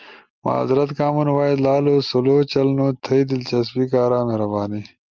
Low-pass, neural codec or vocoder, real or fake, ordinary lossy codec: 7.2 kHz; none; real; Opus, 32 kbps